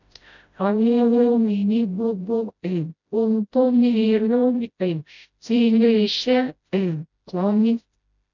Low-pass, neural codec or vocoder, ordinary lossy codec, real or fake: 7.2 kHz; codec, 16 kHz, 0.5 kbps, FreqCodec, smaller model; none; fake